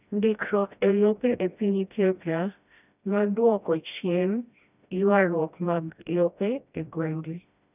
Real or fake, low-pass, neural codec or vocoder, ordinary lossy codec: fake; 3.6 kHz; codec, 16 kHz, 1 kbps, FreqCodec, smaller model; none